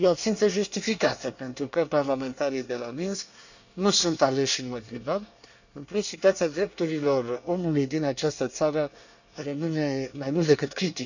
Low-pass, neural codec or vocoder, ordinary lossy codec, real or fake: 7.2 kHz; codec, 24 kHz, 1 kbps, SNAC; none; fake